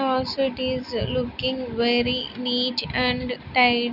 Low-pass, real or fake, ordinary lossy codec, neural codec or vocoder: 5.4 kHz; real; none; none